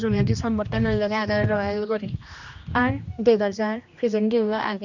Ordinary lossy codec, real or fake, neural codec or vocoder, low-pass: none; fake; codec, 16 kHz, 1 kbps, X-Codec, HuBERT features, trained on general audio; 7.2 kHz